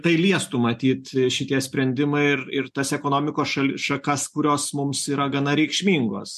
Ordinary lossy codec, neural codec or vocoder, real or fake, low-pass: MP3, 64 kbps; none; real; 14.4 kHz